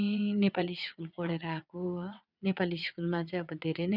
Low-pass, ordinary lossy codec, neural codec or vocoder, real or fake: 5.4 kHz; none; vocoder, 22.05 kHz, 80 mel bands, WaveNeXt; fake